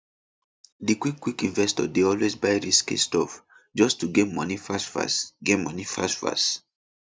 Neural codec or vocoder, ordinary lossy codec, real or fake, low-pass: none; none; real; none